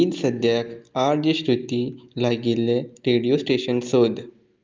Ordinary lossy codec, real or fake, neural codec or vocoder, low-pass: Opus, 24 kbps; real; none; 7.2 kHz